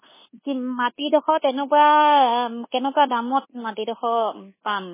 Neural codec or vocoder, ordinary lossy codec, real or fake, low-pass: codec, 24 kHz, 1.2 kbps, DualCodec; MP3, 16 kbps; fake; 3.6 kHz